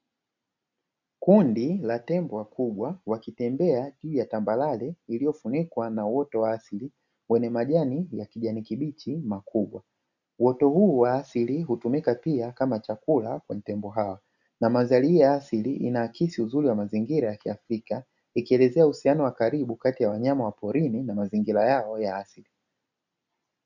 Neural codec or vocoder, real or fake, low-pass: none; real; 7.2 kHz